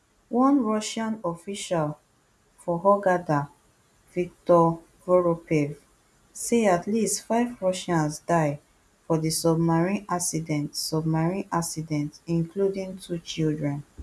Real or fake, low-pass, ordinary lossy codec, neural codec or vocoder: real; none; none; none